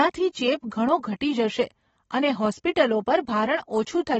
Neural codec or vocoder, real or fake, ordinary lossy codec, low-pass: none; real; AAC, 24 kbps; 14.4 kHz